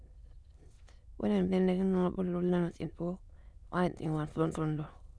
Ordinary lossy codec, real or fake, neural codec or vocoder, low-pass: none; fake; autoencoder, 22.05 kHz, a latent of 192 numbers a frame, VITS, trained on many speakers; none